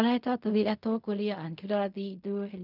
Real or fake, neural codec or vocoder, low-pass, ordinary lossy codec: fake; codec, 16 kHz in and 24 kHz out, 0.4 kbps, LongCat-Audio-Codec, fine tuned four codebook decoder; 5.4 kHz; none